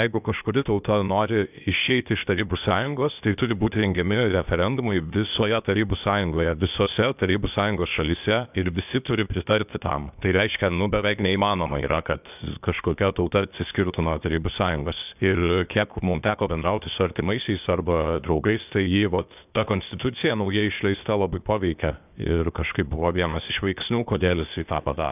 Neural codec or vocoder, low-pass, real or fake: codec, 16 kHz, 0.8 kbps, ZipCodec; 3.6 kHz; fake